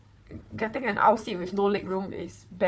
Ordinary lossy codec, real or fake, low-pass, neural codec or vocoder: none; fake; none; codec, 16 kHz, 4 kbps, FunCodec, trained on Chinese and English, 50 frames a second